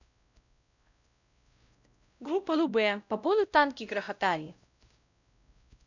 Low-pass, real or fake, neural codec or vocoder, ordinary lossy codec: 7.2 kHz; fake; codec, 16 kHz, 0.5 kbps, X-Codec, WavLM features, trained on Multilingual LibriSpeech; none